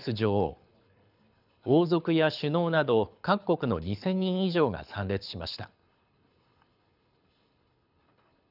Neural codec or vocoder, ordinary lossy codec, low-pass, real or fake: codec, 24 kHz, 6 kbps, HILCodec; none; 5.4 kHz; fake